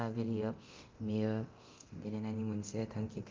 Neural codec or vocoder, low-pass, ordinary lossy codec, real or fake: codec, 24 kHz, 0.9 kbps, DualCodec; 7.2 kHz; Opus, 32 kbps; fake